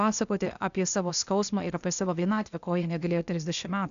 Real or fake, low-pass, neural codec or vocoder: fake; 7.2 kHz; codec, 16 kHz, 0.8 kbps, ZipCodec